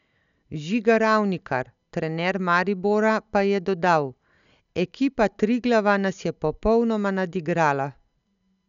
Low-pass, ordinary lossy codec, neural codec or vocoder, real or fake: 7.2 kHz; none; none; real